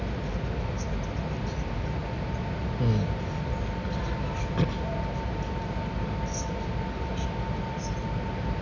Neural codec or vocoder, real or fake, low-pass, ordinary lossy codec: none; real; 7.2 kHz; none